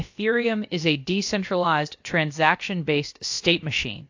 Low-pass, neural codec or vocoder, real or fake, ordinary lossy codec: 7.2 kHz; codec, 16 kHz, about 1 kbps, DyCAST, with the encoder's durations; fake; AAC, 48 kbps